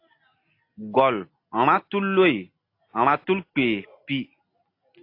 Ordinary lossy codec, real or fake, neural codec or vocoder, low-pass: AAC, 32 kbps; real; none; 5.4 kHz